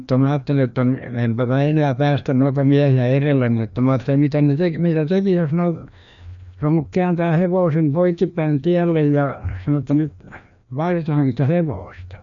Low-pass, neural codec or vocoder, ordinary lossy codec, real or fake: 7.2 kHz; codec, 16 kHz, 1 kbps, FreqCodec, larger model; none; fake